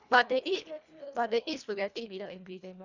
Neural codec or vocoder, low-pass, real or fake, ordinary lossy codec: codec, 24 kHz, 1.5 kbps, HILCodec; 7.2 kHz; fake; none